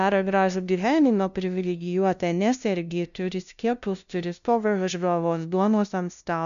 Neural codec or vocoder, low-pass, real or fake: codec, 16 kHz, 0.5 kbps, FunCodec, trained on LibriTTS, 25 frames a second; 7.2 kHz; fake